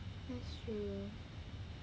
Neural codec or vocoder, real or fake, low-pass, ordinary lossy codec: none; real; none; none